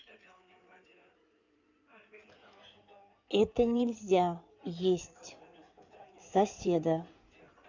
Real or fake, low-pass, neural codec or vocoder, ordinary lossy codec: fake; 7.2 kHz; codec, 16 kHz in and 24 kHz out, 2.2 kbps, FireRedTTS-2 codec; none